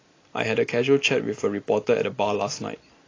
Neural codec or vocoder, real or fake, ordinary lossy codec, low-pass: none; real; AAC, 32 kbps; 7.2 kHz